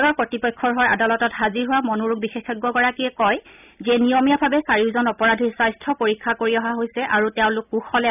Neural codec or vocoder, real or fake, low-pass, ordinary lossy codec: none; real; 3.6 kHz; none